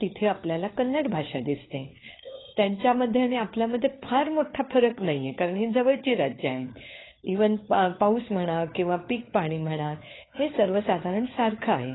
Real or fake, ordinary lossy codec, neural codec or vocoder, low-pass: fake; AAC, 16 kbps; codec, 16 kHz, 4 kbps, FunCodec, trained on LibriTTS, 50 frames a second; 7.2 kHz